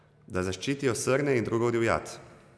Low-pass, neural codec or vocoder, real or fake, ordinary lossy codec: none; none; real; none